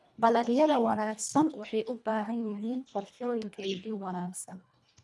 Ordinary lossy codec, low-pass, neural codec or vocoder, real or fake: none; none; codec, 24 kHz, 1.5 kbps, HILCodec; fake